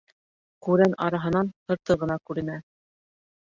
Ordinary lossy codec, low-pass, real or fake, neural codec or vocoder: Opus, 64 kbps; 7.2 kHz; real; none